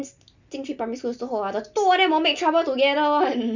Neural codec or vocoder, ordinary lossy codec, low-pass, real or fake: none; none; 7.2 kHz; real